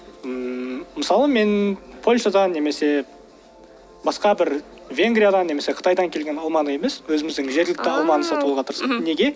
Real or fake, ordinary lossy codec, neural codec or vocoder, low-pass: real; none; none; none